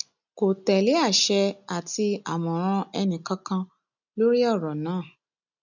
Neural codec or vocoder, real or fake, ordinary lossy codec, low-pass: none; real; none; 7.2 kHz